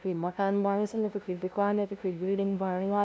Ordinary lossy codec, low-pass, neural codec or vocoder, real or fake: none; none; codec, 16 kHz, 0.5 kbps, FunCodec, trained on LibriTTS, 25 frames a second; fake